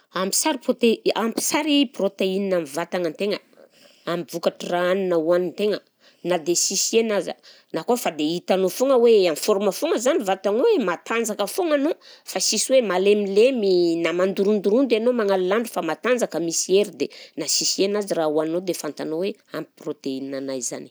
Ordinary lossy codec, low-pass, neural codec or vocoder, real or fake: none; none; none; real